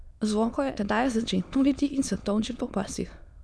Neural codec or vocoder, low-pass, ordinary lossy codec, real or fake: autoencoder, 22.05 kHz, a latent of 192 numbers a frame, VITS, trained on many speakers; none; none; fake